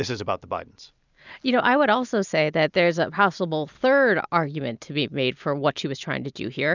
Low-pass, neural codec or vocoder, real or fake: 7.2 kHz; none; real